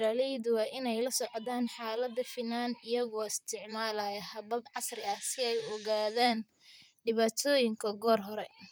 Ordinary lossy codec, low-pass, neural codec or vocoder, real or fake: none; none; vocoder, 44.1 kHz, 128 mel bands, Pupu-Vocoder; fake